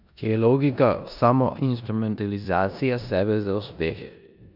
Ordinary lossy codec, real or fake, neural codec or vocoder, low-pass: none; fake; codec, 16 kHz in and 24 kHz out, 0.9 kbps, LongCat-Audio-Codec, four codebook decoder; 5.4 kHz